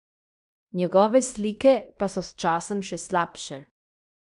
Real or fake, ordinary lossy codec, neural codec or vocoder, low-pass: fake; none; codec, 16 kHz in and 24 kHz out, 0.9 kbps, LongCat-Audio-Codec, fine tuned four codebook decoder; 10.8 kHz